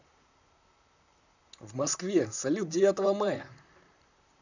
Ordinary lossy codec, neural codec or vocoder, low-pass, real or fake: none; vocoder, 44.1 kHz, 128 mel bands, Pupu-Vocoder; 7.2 kHz; fake